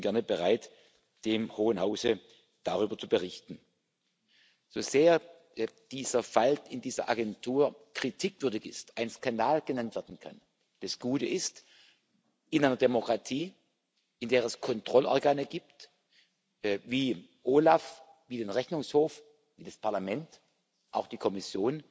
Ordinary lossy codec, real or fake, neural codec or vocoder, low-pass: none; real; none; none